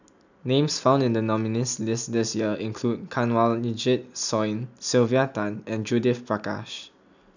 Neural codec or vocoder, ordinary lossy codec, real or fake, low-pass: none; none; real; 7.2 kHz